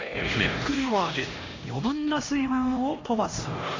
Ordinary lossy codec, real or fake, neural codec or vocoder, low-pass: AAC, 32 kbps; fake; codec, 16 kHz, 1 kbps, X-Codec, HuBERT features, trained on LibriSpeech; 7.2 kHz